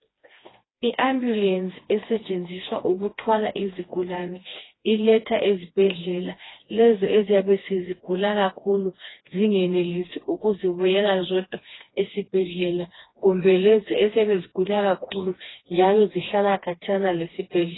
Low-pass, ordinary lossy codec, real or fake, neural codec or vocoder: 7.2 kHz; AAC, 16 kbps; fake; codec, 16 kHz, 2 kbps, FreqCodec, smaller model